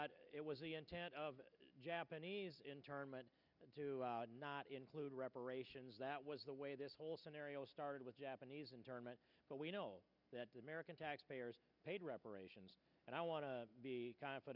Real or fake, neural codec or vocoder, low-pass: real; none; 5.4 kHz